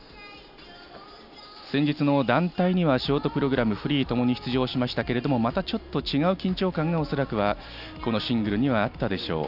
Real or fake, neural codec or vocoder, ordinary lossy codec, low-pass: real; none; none; 5.4 kHz